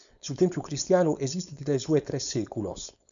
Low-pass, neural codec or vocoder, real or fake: 7.2 kHz; codec, 16 kHz, 4.8 kbps, FACodec; fake